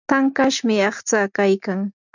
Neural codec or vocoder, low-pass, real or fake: none; 7.2 kHz; real